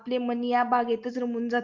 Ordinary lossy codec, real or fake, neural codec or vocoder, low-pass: Opus, 32 kbps; real; none; 7.2 kHz